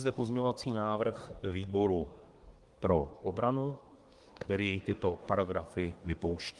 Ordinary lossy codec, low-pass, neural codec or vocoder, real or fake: Opus, 24 kbps; 10.8 kHz; codec, 24 kHz, 1 kbps, SNAC; fake